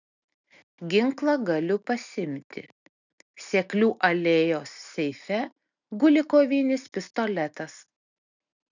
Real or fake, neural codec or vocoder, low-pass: real; none; 7.2 kHz